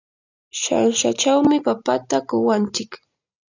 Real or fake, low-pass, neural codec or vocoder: real; 7.2 kHz; none